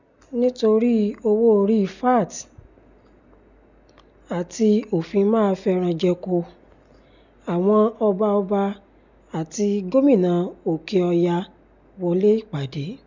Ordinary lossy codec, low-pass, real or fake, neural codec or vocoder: none; 7.2 kHz; real; none